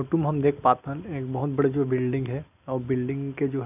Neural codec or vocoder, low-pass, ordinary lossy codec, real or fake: none; 3.6 kHz; none; real